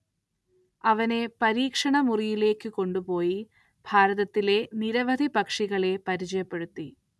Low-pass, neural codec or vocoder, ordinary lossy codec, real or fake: none; none; none; real